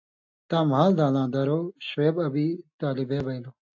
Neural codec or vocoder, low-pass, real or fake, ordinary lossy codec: none; 7.2 kHz; real; AAC, 48 kbps